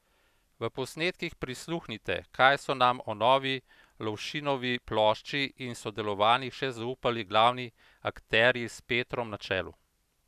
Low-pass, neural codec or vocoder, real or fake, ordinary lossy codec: 14.4 kHz; none; real; none